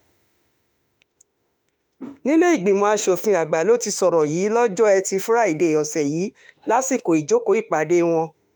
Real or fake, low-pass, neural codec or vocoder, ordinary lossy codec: fake; none; autoencoder, 48 kHz, 32 numbers a frame, DAC-VAE, trained on Japanese speech; none